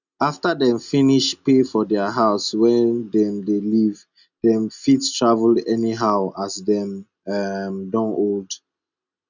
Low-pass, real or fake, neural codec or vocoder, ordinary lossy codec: 7.2 kHz; real; none; none